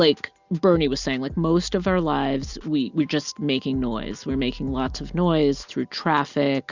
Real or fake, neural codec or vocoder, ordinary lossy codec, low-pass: real; none; Opus, 64 kbps; 7.2 kHz